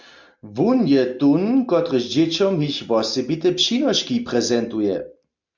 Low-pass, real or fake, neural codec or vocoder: 7.2 kHz; real; none